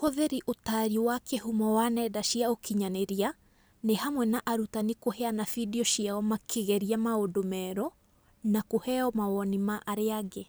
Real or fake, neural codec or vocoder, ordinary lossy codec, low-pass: real; none; none; none